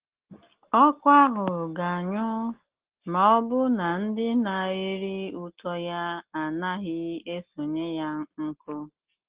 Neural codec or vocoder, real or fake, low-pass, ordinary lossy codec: none; real; 3.6 kHz; Opus, 16 kbps